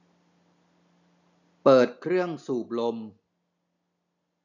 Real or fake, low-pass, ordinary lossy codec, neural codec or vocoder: real; 7.2 kHz; none; none